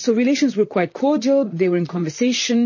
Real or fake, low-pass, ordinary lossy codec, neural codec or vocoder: fake; 7.2 kHz; MP3, 32 kbps; vocoder, 44.1 kHz, 128 mel bands, Pupu-Vocoder